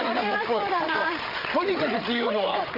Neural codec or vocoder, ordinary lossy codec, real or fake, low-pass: codec, 16 kHz, 8 kbps, FreqCodec, larger model; none; fake; 5.4 kHz